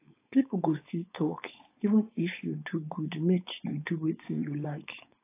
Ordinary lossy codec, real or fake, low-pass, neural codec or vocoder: AAC, 24 kbps; fake; 3.6 kHz; codec, 16 kHz, 4.8 kbps, FACodec